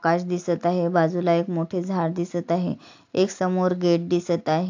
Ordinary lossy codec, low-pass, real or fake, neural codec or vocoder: AAC, 48 kbps; 7.2 kHz; real; none